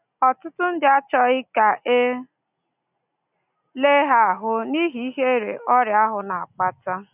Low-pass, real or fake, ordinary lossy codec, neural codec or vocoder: 3.6 kHz; real; none; none